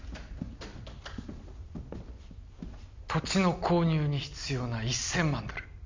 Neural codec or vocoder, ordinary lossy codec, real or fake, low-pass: none; MP3, 64 kbps; real; 7.2 kHz